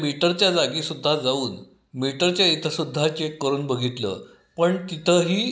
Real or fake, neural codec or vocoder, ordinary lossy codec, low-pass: real; none; none; none